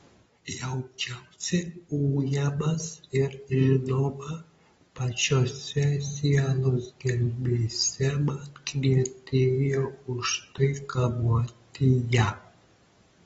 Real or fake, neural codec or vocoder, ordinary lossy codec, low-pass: real; none; AAC, 24 kbps; 19.8 kHz